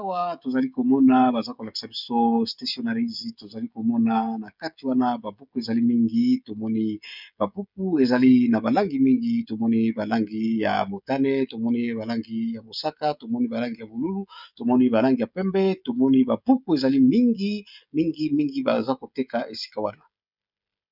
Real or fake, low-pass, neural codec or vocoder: fake; 5.4 kHz; codec, 16 kHz, 16 kbps, FreqCodec, smaller model